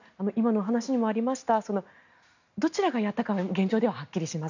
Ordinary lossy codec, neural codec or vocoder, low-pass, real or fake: none; none; 7.2 kHz; real